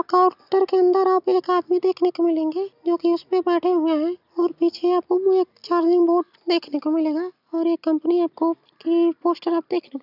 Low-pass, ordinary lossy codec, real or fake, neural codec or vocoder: 5.4 kHz; none; real; none